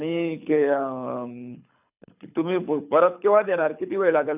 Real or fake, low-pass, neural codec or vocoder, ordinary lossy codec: fake; 3.6 kHz; codec, 24 kHz, 6 kbps, HILCodec; none